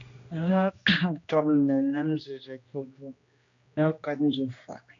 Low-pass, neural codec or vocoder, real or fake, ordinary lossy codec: 7.2 kHz; codec, 16 kHz, 1 kbps, X-Codec, HuBERT features, trained on general audio; fake; AAC, 48 kbps